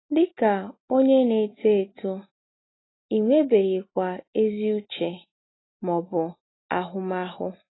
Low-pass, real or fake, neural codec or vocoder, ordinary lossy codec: 7.2 kHz; real; none; AAC, 16 kbps